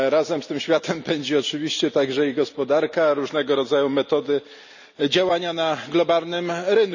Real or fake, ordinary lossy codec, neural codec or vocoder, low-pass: real; none; none; 7.2 kHz